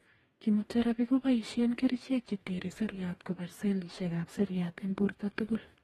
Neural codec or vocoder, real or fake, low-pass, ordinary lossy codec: codec, 44.1 kHz, 2.6 kbps, DAC; fake; 19.8 kHz; AAC, 32 kbps